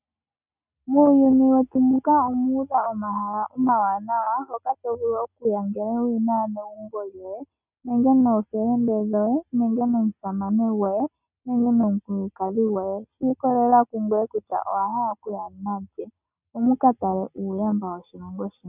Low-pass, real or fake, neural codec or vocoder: 3.6 kHz; real; none